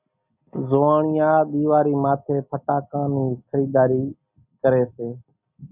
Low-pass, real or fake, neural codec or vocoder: 3.6 kHz; real; none